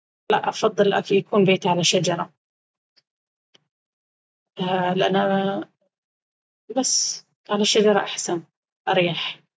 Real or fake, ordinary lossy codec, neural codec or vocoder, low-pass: real; none; none; none